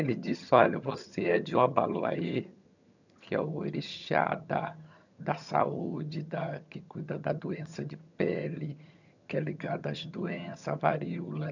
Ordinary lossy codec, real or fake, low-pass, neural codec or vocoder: none; fake; 7.2 kHz; vocoder, 22.05 kHz, 80 mel bands, HiFi-GAN